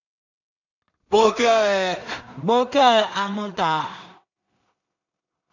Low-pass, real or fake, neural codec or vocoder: 7.2 kHz; fake; codec, 16 kHz in and 24 kHz out, 0.4 kbps, LongCat-Audio-Codec, two codebook decoder